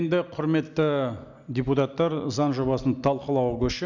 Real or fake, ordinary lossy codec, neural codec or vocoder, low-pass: real; none; none; 7.2 kHz